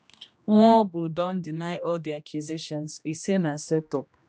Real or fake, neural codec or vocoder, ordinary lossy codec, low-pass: fake; codec, 16 kHz, 1 kbps, X-Codec, HuBERT features, trained on general audio; none; none